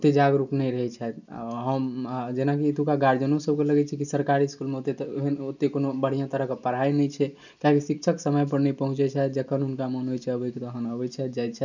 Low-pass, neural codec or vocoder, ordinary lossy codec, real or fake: 7.2 kHz; none; none; real